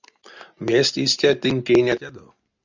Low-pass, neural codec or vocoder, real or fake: 7.2 kHz; none; real